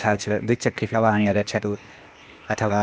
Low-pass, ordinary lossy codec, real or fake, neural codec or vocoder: none; none; fake; codec, 16 kHz, 0.8 kbps, ZipCodec